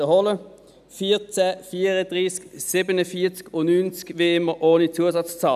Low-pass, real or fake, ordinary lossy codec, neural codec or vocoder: 14.4 kHz; real; none; none